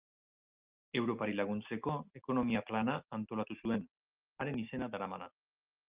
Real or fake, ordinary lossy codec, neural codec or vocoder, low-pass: real; Opus, 24 kbps; none; 3.6 kHz